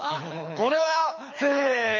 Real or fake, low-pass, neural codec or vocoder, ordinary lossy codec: fake; 7.2 kHz; codec, 24 kHz, 6 kbps, HILCodec; MP3, 32 kbps